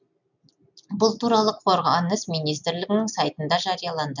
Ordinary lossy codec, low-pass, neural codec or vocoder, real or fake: none; 7.2 kHz; none; real